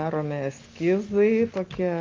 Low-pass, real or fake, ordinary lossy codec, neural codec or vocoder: 7.2 kHz; real; Opus, 24 kbps; none